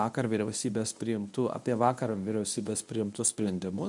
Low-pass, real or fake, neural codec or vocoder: 10.8 kHz; fake; codec, 24 kHz, 0.9 kbps, WavTokenizer, medium speech release version 1